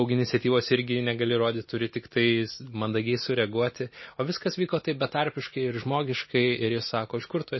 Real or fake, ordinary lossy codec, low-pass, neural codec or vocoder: real; MP3, 24 kbps; 7.2 kHz; none